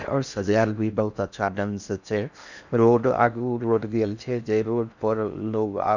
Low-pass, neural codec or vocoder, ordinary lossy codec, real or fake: 7.2 kHz; codec, 16 kHz in and 24 kHz out, 0.6 kbps, FocalCodec, streaming, 4096 codes; none; fake